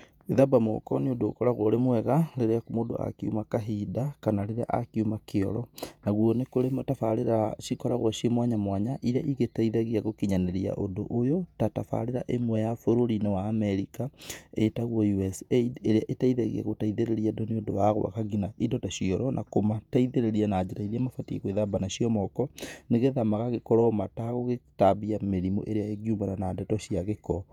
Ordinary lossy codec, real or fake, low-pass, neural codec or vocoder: none; fake; 19.8 kHz; vocoder, 48 kHz, 128 mel bands, Vocos